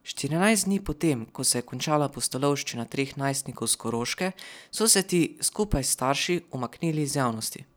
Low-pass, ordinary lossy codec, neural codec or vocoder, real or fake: none; none; none; real